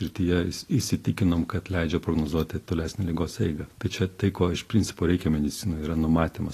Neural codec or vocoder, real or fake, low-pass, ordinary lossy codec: none; real; 14.4 kHz; AAC, 48 kbps